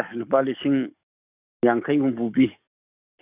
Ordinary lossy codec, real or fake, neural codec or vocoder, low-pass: none; fake; codec, 44.1 kHz, 7.8 kbps, DAC; 3.6 kHz